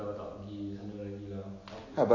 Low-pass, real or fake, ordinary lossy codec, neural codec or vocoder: 7.2 kHz; real; AAC, 32 kbps; none